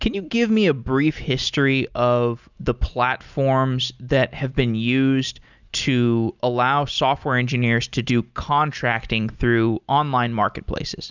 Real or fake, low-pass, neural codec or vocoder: real; 7.2 kHz; none